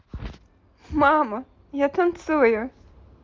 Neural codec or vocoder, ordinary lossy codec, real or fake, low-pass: none; Opus, 32 kbps; real; 7.2 kHz